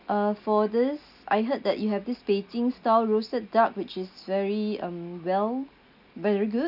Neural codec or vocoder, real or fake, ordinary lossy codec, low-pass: none; real; none; 5.4 kHz